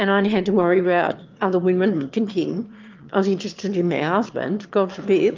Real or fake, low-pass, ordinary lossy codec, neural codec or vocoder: fake; 7.2 kHz; Opus, 24 kbps; autoencoder, 22.05 kHz, a latent of 192 numbers a frame, VITS, trained on one speaker